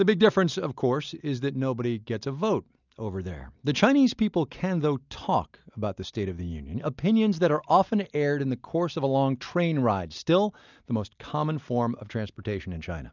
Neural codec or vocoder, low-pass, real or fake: none; 7.2 kHz; real